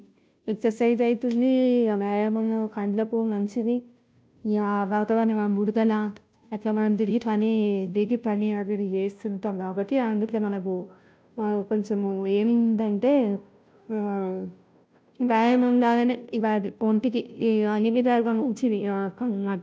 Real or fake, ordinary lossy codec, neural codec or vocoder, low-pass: fake; none; codec, 16 kHz, 0.5 kbps, FunCodec, trained on Chinese and English, 25 frames a second; none